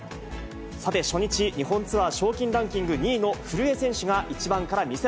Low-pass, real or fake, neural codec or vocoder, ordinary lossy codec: none; real; none; none